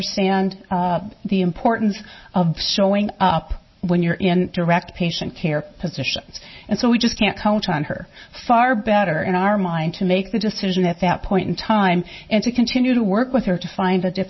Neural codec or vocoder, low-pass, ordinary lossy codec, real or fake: none; 7.2 kHz; MP3, 24 kbps; real